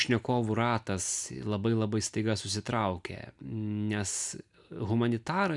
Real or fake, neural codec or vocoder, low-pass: real; none; 10.8 kHz